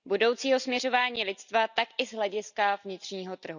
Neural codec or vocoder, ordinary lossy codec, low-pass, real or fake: none; none; 7.2 kHz; real